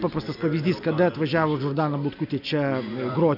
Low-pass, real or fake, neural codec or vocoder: 5.4 kHz; real; none